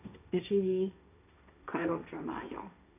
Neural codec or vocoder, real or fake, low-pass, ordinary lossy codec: codec, 16 kHz, 1.1 kbps, Voila-Tokenizer; fake; 3.6 kHz; none